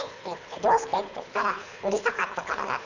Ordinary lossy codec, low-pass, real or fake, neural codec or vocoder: none; 7.2 kHz; fake; codec, 24 kHz, 3 kbps, HILCodec